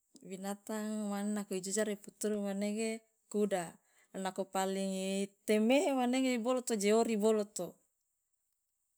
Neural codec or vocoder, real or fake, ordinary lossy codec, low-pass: none; real; none; none